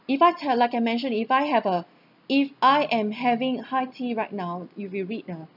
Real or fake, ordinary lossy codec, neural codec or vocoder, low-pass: real; none; none; 5.4 kHz